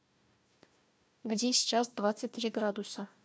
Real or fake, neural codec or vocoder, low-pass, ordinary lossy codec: fake; codec, 16 kHz, 1 kbps, FunCodec, trained on Chinese and English, 50 frames a second; none; none